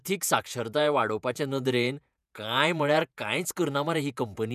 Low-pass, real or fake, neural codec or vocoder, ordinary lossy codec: 14.4 kHz; fake; vocoder, 48 kHz, 128 mel bands, Vocos; none